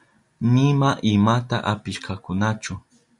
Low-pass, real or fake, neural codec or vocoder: 10.8 kHz; real; none